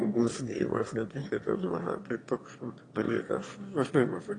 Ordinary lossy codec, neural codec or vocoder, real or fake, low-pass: AAC, 32 kbps; autoencoder, 22.05 kHz, a latent of 192 numbers a frame, VITS, trained on one speaker; fake; 9.9 kHz